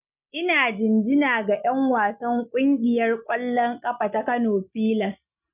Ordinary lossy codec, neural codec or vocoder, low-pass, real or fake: none; none; 3.6 kHz; real